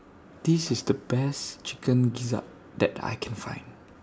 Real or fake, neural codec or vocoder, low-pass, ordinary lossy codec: real; none; none; none